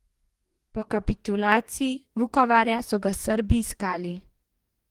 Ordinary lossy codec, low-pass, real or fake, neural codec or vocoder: Opus, 24 kbps; 19.8 kHz; fake; codec, 44.1 kHz, 2.6 kbps, DAC